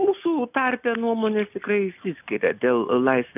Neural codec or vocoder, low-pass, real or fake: none; 3.6 kHz; real